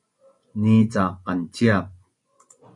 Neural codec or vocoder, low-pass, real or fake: vocoder, 24 kHz, 100 mel bands, Vocos; 10.8 kHz; fake